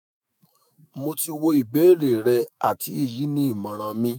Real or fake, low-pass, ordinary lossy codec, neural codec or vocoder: fake; none; none; autoencoder, 48 kHz, 128 numbers a frame, DAC-VAE, trained on Japanese speech